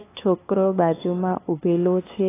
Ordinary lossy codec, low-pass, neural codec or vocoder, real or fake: AAC, 16 kbps; 3.6 kHz; codec, 16 kHz, 2 kbps, FunCodec, trained on LibriTTS, 25 frames a second; fake